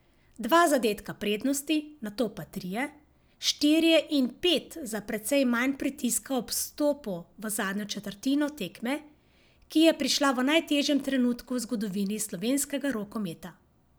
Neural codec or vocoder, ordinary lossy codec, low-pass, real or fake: none; none; none; real